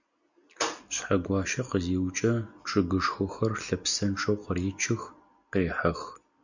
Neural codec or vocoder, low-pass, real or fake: none; 7.2 kHz; real